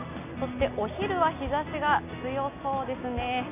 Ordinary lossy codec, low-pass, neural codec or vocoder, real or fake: none; 3.6 kHz; none; real